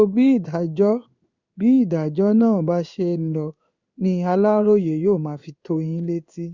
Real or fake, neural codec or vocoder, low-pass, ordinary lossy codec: fake; codec, 16 kHz in and 24 kHz out, 1 kbps, XY-Tokenizer; 7.2 kHz; Opus, 64 kbps